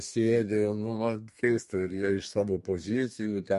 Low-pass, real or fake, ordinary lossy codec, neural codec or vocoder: 14.4 kHz; fake; MP3, 48 kbps; codec, 32 kHz, 1.9 kbps, SNAC